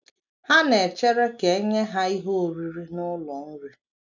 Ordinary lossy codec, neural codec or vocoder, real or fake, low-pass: none; none; real; 7.2 kHz